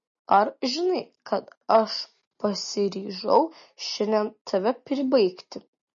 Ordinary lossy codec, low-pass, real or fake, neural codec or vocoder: MP3, 32 kbps; 7.2 kHz; real; none